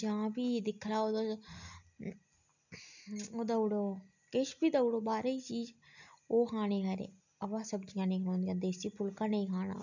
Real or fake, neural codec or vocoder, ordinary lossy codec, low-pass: real; none; none; 7.2 kHz